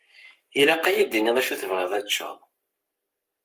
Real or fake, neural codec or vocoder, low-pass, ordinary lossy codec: fake; codec, 44.1 kHz, 7.8 kbps, Pupu-Codec; 14.4 kHz; Opus, 32 kbps